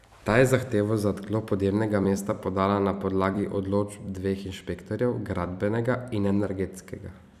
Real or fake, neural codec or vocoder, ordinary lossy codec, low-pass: fake; vocoder, 44.1 kHz, 128 mel bands every 256 samples, BigVGAN v2; none; 14.4 kHz